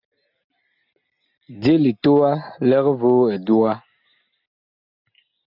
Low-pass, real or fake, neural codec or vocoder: 5.4 kHz; real; none